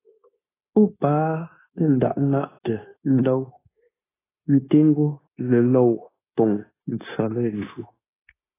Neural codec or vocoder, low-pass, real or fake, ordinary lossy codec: codec, 16 kHz, 0.9 kbps, LongCat-Audio-Codec; 3.6 kHz; fake; AAC, 16 kbps